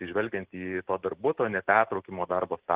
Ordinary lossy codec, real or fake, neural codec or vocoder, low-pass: Opus, 16 kbps; real; none; 3.6 kHz